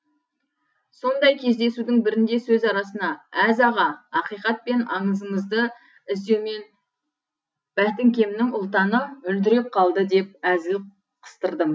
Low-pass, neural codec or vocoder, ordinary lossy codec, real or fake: none; none; none; real